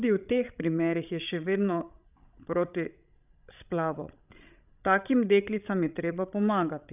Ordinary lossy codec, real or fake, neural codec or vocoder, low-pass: none; fake; codec, 16 kHz, 16 kbps, FreqCodec, larger model; 3.6 kHz